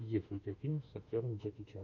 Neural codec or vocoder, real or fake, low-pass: autoencoder, 48 kHz, 32 numbers a frame, DAC-VAE, trained on Japanese speech; fake; 7.2 kHz